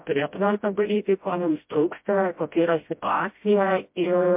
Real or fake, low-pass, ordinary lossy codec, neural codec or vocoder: fake; 3.6 kHz; MP3, 32 kbps; codec, 16 kHz, 0.5 kbps, FreqCodec, smaller model